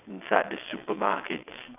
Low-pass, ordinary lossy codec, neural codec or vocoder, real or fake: 3.6 kHz; none; vocoder, 22.05 kHz, 80 mel bands, WaveNeXt; fake